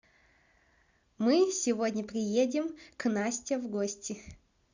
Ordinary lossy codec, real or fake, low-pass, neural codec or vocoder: Opus, 64 kbps; real; 7.2 kHz; none